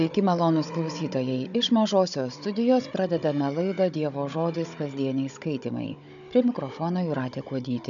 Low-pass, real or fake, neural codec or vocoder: 7.2 kHz; fake; codec, 16 kHz, 16 kbps, FunCodec, trained on Chinese and English, 50 frames a second